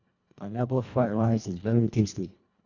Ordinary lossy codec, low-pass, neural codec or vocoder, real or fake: none; 7.2 kHz; codec, 24 kHz, 1.5 kbps, HILCodec; fake